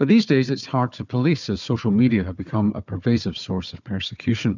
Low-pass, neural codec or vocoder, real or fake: 7.2 kHz; codec, 16 kHz, 4 kbps, FunCodec, trained on Chinese and English, 50 frames a second; fake